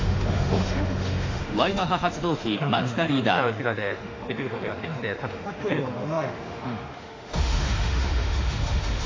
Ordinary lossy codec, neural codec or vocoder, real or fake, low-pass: AAC, 32 kbps; autoencoder, 48 kHz, 32 numbers a frame, DAC-VAE, trained on Japanese speech; fake; 7.2 kHz